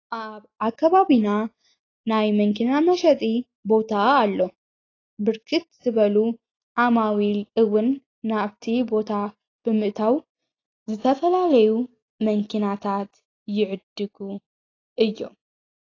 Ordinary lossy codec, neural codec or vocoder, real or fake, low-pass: AAC, 32 kbps; none; real; 7.2 kHz